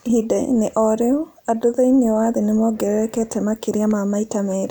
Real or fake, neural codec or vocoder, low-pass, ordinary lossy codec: real; none; none; none